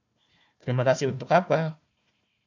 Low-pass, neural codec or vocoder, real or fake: 7.2 kHz; codec, 16 kHz, 1 kbps, FunCodec, trained on Chinese and English, 50 frames a second; fake